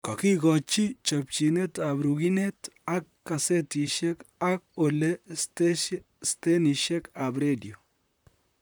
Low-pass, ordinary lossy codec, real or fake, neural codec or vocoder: none; none; fake; vocoder, 44.1 kHz, 128 mel bands, Pupu-Vocoder